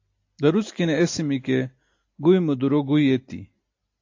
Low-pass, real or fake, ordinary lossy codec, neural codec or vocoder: 7.2 kHz; real; AAC, 48 kbps; none